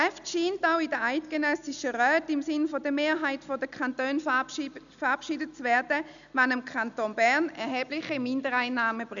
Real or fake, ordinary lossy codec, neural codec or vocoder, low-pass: real; none; none; 7.2 kHz